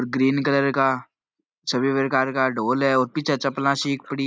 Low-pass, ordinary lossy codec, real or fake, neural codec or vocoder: none; none; real; none